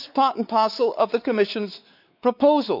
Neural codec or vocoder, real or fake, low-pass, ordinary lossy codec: codec, 16 kHz, 4 kbps, X-Codec, WavLM features, trained on Multilingual LibriSpeech; fake; 5.4 kHz; none